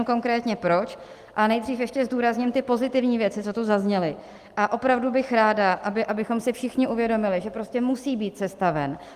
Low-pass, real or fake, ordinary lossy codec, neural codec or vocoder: 14.4 kHz; real; Opus, 24 kbps; none